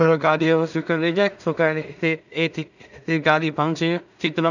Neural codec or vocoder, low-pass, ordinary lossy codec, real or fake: codec, 16 kHz in and 24 kHz out, 0.4 kbps, LongCat-Audio-Codec, two codebook decoder; 7.2 kHz; none; fake